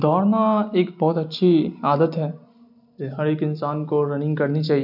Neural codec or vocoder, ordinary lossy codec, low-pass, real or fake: none; none; 5.4 kHz; real